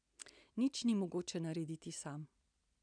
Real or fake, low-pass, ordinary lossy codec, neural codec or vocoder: real; 9.9 kHz; none; none